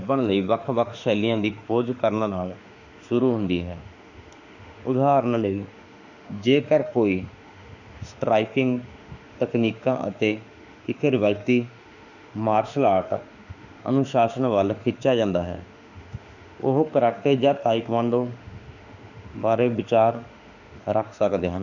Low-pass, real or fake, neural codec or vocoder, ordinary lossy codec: 7.2 kHz; fake; autoencoder, 48 kHz, 32 numbers a frame, DAC-VAE, trained on Japanese speech; none